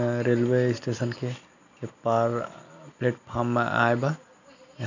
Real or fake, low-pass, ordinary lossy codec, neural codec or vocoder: real; 7.2 kHz; none; none